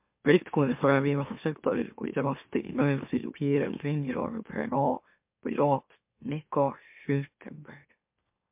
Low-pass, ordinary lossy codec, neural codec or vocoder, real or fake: 3.6 kHz; MP3, 32 kbps; autoencoder, 44.1 kHz, a latent of 192 numbers a frame, MeloTTS; fake